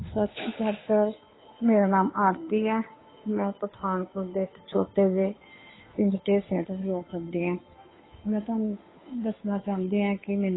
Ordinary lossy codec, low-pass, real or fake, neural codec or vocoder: AAC, 16 kbps; 7.2 kHz; fake; codec, 16 kHz, 2 kbps, FunCodec, trained on Chinese and English, 25 frames a second